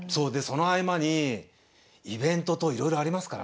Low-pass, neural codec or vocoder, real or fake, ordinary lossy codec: none; none; real; none